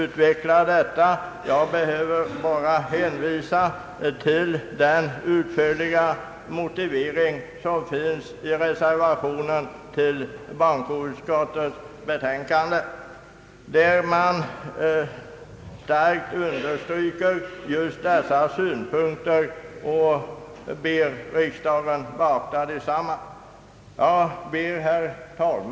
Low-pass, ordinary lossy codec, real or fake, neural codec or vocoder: none; none; real; none